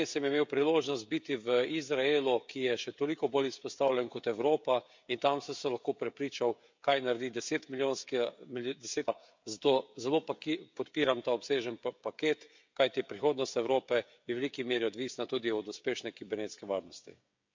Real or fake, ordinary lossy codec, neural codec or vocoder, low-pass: fake; MP3, 64 kbps; codec, 16 kHz, 16 kbps, FreqCodec, smaller model; 7.2 kHz